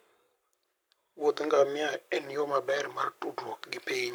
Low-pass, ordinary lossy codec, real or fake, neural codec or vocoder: none; none; fake; codec, 44.1 kHz, 7.8 kbps, Pupu-Codec